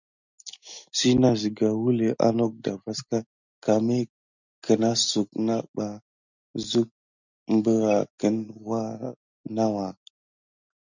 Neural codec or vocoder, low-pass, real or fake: none; 7.2 kHz; real